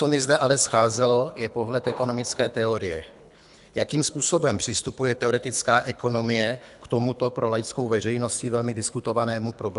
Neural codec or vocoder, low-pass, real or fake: codec, 24 kHz, 3 kbps, HILCodec; 10.8 kHz; fake